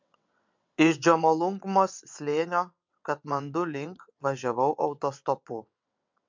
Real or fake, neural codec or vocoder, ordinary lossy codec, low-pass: fake; vocoder, 22.05 kHz, 80 mel bands, WaveNeXt; MP3, 64 kbps; 7.2 kHz